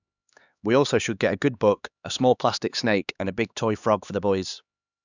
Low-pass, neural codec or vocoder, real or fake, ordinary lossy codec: 7.2 kHz; codec, 16 kHz, 2 kbps, X-Codec, HuBERT features, trained on LibriSpeech; fake; none